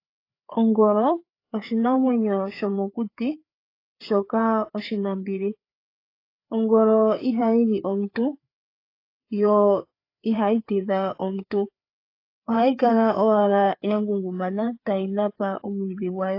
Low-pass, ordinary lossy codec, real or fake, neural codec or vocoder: 5.4 kHz; AAC, 32 kbps; fake; codec, 16 kHz, 4 kbps, FreqCodec, larger model